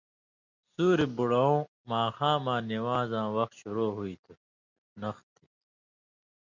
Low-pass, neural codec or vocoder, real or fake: 7.2 kHz; none; real